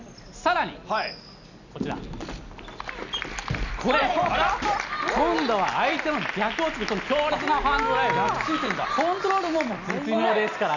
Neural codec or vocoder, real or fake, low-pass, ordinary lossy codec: none; real; 7.2 kHz; none